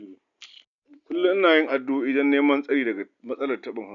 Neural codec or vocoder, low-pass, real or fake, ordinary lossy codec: none; 7.2 kHz; real; none